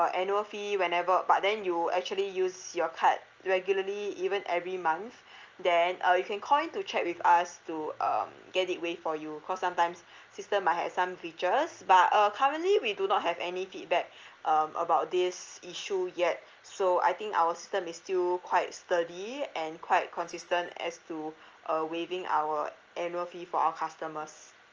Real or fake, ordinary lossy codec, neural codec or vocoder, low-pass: real; Opus, 24 kbps; none; 7.2 kHz